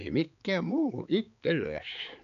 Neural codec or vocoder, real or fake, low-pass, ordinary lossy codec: codec, 16 kHz, 4 kbps, X-Codec, HuBERT features, trained on balanced general audio; fake; 7.2 kHz; none